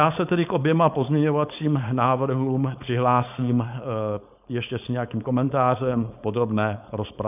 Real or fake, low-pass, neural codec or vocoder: fake; 3.6 kHz; codec, 16 kHz, 4.8 kbps, FACodec